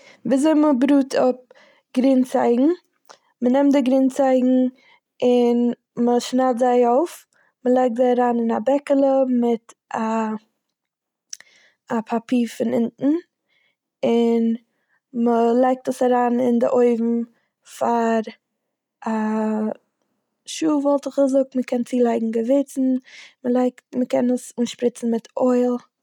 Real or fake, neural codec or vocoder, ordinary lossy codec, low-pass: real; none; none; 19.8 kHz